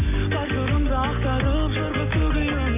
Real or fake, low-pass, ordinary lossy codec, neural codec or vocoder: real; 3.6 kHz; none; none